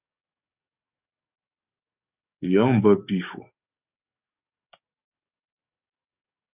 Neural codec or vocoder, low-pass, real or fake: codec, 44.1 kHz, 7.8 kbps, DAC; 3.6 kHz; fake